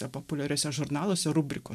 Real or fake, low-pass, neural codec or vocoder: real; 14.4 kHz; none